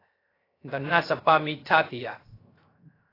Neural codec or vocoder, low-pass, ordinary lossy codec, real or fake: codec, 16 kHz, 0.3 kbps, FocalCodec; 5.4 kHz; AAC, 24 kbps; fake